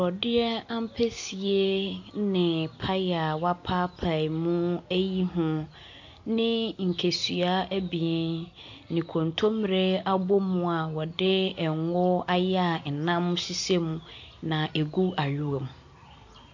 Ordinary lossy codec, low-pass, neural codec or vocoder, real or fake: AAC, 48 kbps; 7.2 kHz; none; real